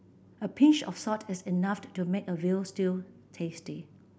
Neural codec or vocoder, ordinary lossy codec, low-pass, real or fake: none; none; none; real